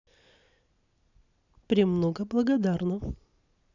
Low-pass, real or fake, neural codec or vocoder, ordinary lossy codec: 7.2 kHz; real; none; none